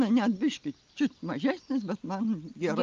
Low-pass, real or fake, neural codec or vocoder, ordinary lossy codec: 7.2 kHz; real; none; Opus, 24 kbps